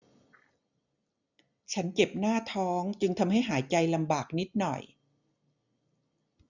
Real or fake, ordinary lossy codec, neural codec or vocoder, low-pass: real; none; none; 7.2 kHz